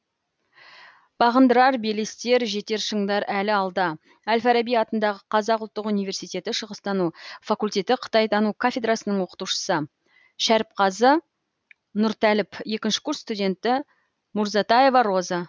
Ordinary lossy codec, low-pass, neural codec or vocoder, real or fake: none; none; none; real